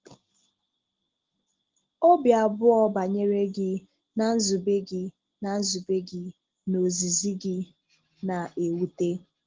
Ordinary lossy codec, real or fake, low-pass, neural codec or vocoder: Opus, 16 kbps; real; 7.2 kHz; none